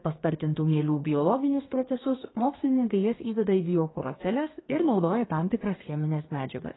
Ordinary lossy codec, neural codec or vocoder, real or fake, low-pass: AAC, 16 kbps; codec, 32 kHz, 1.9 kbps, SNAC; fake; 7.2 kHz